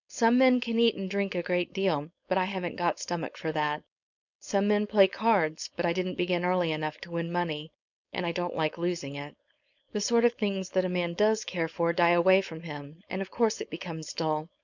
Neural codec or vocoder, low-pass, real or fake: codec, 16 kHz, 4.8 kbps, FACodec; 7.2 kHz; fake